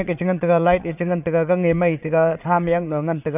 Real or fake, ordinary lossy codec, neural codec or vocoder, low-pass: fake; none; vocoder, 22.05 kHz, 80 mel bands, Vocos; 3.6 kHz